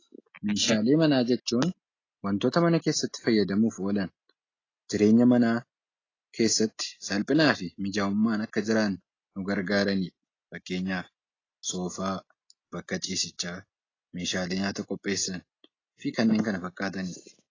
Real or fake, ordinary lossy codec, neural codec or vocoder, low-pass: real; AAC, 32 kbps; none; 7.2 kHz